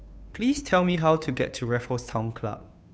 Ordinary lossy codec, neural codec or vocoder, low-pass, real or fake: none; codec, 16 kHz, 2 kbps, FunCodec, trained on Chinese and English, 25 frames a second; none; fake